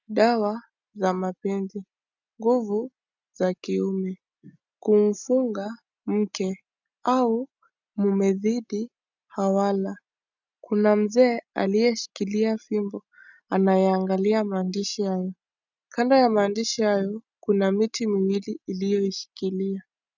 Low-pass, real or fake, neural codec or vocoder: 7.2 kHz; real; none